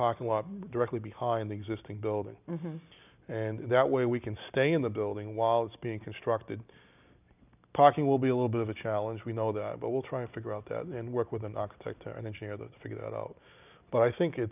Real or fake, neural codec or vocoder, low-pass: real; none; 3.6 kHz